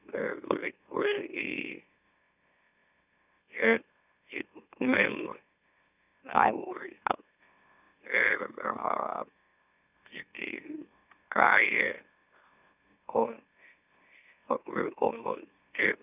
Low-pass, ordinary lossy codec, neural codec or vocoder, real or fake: 3.6 kHz; none; autoencoder, 44.1 kHz, a latent of 192 numbers a frame, MeloTTS; fake